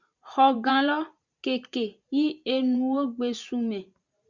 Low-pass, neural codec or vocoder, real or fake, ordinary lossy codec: 7.2 kHz; vocoder, 44.1 kHz, 128 mel bands every 512 samples, BigVGAN v2; fake; Opus, 64 kbps